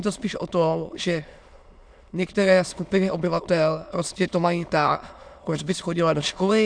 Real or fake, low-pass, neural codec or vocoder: fake; 9.9 kHz; autoencoder, 22.05 kHz, a latent of 192 numbers a frame, VITS, trained on many speakers